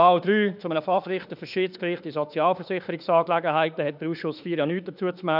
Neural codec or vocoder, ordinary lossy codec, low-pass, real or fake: autoencoder, 48 kHz, 32 numbers a frame, DAC-VAE, trained on Japanese speech; none; 5.4 kHz; fake